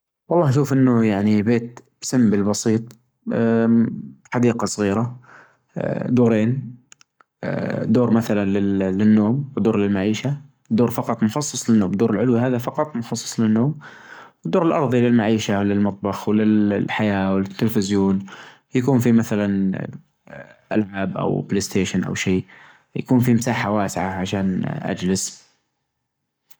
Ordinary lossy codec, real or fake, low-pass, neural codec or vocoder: none; fake; none; codec, 44.1 kHz, 7.8 kbps, Pupu-Codec